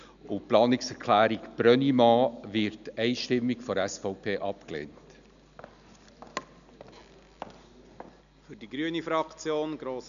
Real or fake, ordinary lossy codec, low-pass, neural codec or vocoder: real; none; 7.2 kHz; none